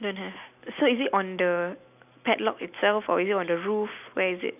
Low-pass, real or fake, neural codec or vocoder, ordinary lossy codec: 3.6 kHz; real; none; none